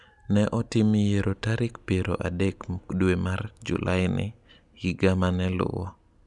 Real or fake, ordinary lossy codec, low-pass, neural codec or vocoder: real; none; 10.8 kHz; none